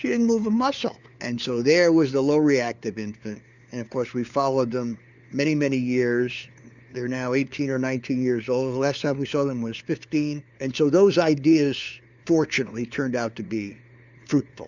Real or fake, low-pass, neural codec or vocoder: fake; 7.2 kHz; codec, 16 kHz, 4 kbps, FunCodec, trained on LibriTTS, 50 frames a second